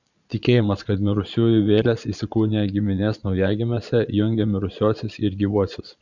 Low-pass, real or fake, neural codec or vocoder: 7.2 kHz; fake; vocoder, 22.05 kHz, 80 mel bands, Vocos